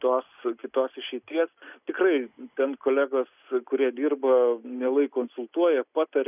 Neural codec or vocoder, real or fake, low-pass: none; real; 3.6 kHz